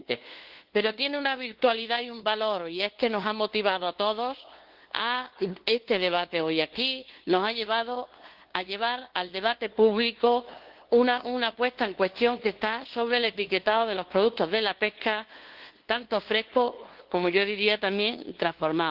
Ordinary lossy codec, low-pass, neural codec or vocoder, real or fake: Opus, 16 kbps; 5.4 kHz; codec, 16 kHz, 2 kbps, FunCodec, trained on LibriTTS, 25 frames a second; fake